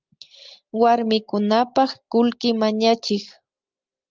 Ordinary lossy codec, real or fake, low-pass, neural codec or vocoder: Opus, 24 kbps; real; 7.2 kHz; none